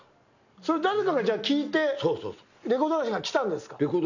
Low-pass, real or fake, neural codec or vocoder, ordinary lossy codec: 7.2 kHz; real; none; none